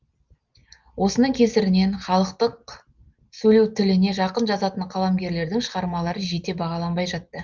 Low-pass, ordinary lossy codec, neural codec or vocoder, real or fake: 7.2 kHz; Opus, 32 kbps; none; real